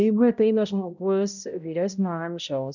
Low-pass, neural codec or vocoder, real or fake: 7.2 kHz; codec, 16 kHz, 0.5 kbps, X-Codec, HuBERT features, trained on balanced general audio; fake